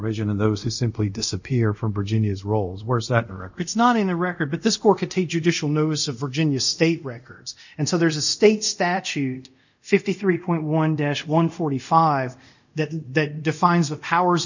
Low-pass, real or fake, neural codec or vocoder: 7.2 kHz; fake; codec, 24 kHz, 0.5 kbps, DualCodec